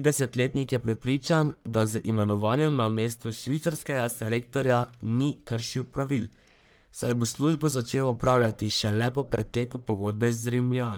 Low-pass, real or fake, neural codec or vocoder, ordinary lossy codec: none; fake; codec, 44.1 kHz, 1.7 kbps, Pupu-Codec; none